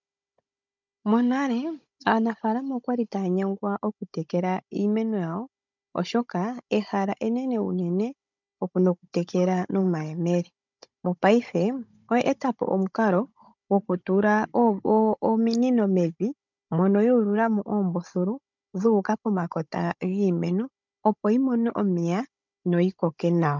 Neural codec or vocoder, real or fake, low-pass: codec, 16 kHz, 16 kbps, FunCodec, trained on Chinese and English, 50 frames a second; fake; 7.2 kHz